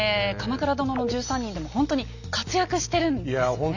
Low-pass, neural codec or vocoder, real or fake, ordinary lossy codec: 7.2 kHz; none; real; none